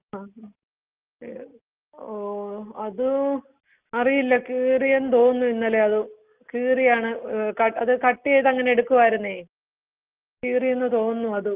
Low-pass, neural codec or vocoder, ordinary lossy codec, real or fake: 3.6 kHz; none; Opus, 32 kbps; real